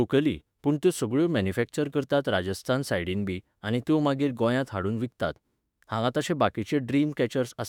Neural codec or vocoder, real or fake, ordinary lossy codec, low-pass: autoencoder, 48 kHz, 32 numbers a frame, DAC-VAE, trained on Japanese speech; fake; none; 19.8 kHz